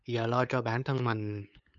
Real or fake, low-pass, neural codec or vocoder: fake; 7.2 kHz; codec, 16 kHz, 8 kbps, FunCodec, trained on LibriTTS, 25 frames a second